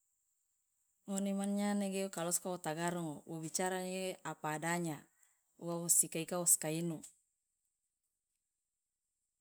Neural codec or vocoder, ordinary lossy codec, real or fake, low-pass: none; none; real; none